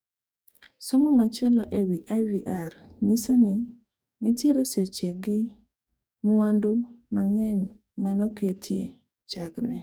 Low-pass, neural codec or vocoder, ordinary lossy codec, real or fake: none; codec, 44.1 kHz, 2.6 kbps, DAC; none; fake